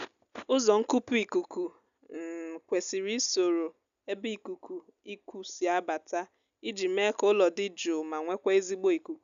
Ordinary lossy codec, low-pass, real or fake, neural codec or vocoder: none; 7.2 kHz; real; none